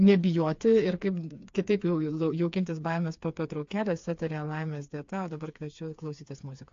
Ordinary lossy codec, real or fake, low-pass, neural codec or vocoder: AAC, 48 kbps; fake; 7.2 kHz; codec, 16 kHz, 4 kbps, FreqCodec, smaller model